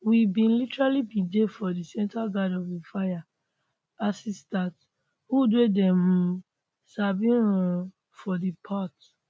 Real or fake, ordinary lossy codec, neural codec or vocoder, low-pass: real; none; none; none